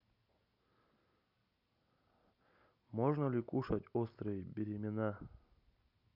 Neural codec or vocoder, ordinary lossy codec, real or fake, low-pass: none; none; real; 5.4 kHz